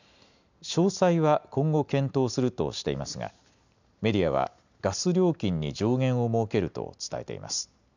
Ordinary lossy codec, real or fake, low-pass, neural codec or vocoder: none; real; 7.2 kHz; none